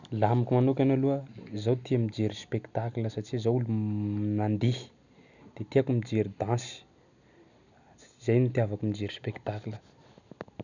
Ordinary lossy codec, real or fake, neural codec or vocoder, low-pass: none; real; none; 7.2 kHz